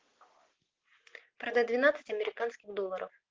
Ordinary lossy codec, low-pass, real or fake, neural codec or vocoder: Opus, 16 kbps; 7.2 kHz; real; none